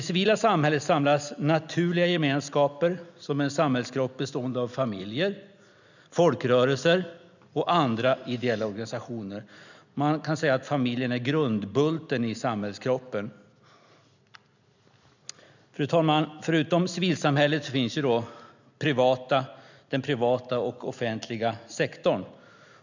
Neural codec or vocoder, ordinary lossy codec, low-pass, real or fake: none; none; 7.2 kHz; real